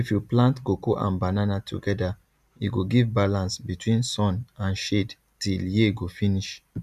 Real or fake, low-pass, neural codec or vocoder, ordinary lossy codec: real; 14.4 kHz; none; none